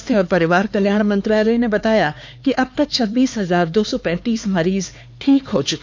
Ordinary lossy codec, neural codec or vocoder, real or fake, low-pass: none; codec, 16 kHz, 4 kbps, X-Codec, HuBERT features, trained on LibriSpeech; fake; none